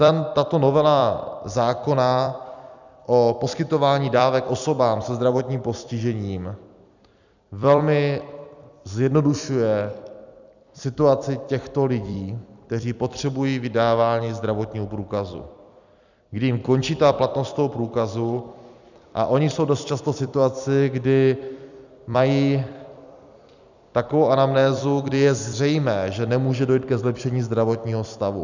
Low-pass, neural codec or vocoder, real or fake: 7.2 kHz; vocoder, 44.1 kHz, 128 mel bands every 256 samples, BigVGAN v2; fake